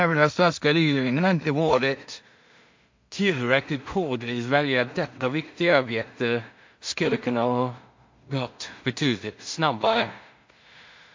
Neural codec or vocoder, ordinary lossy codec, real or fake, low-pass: codec, 16 kHz in and 24 kHz out, 0.4 kbps, LongCat-Audio-Codec, two codebook decoder; MP3, 48 kbps; fake; 7.2 kHz